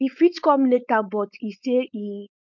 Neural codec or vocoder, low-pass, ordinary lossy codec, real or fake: codec, 16 kHz, 4.8 kbps, FACodec; 7.2 kHz; none; fake